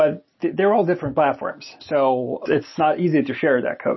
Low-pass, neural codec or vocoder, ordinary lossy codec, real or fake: 7.2 kHz; none; MP3, 24 kbps; real